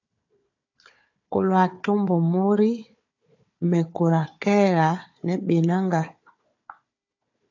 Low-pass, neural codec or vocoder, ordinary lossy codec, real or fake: 7.2 kHz; codec, 16 kHz, 4 kbps, FunCodec, trained on Chinese and English, 50 frames a second; AAC, 48 kbps; fake